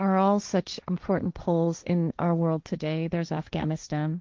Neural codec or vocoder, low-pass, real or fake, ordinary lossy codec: codec, 16 kHz, 1.1 kbps, Voila-Tokenizer; 7.2 kHz; fake; Opus, 24 kbps